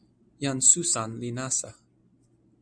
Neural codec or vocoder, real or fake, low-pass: none; real; 9.9 kHz